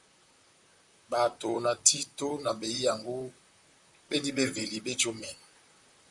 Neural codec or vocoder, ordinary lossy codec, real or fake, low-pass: vocoder, 44.1 kHz, 128 mel bands, Pupu-Vocoder; MP3, 96 kbps; fake; 10.8 kHz